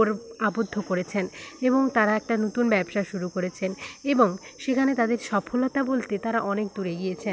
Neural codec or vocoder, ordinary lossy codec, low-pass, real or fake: none; none; none; real